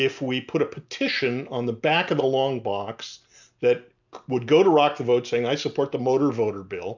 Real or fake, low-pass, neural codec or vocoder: real; 7.2 kHz; none